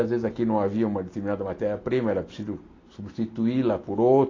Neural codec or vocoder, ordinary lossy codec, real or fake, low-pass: none; AAC, 32 kbps; real; 7.2 kHz